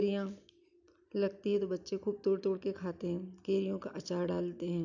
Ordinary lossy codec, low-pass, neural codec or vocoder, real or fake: none; 7.2 kHz; none; real